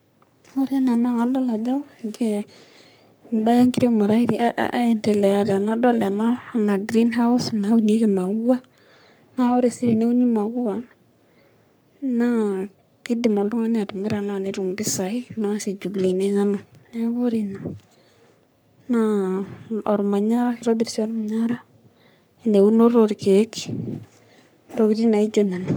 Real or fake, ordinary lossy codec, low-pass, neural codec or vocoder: fake; none; none; codec, 44.1 kHz, 3.4 kbps, Pupu-Codec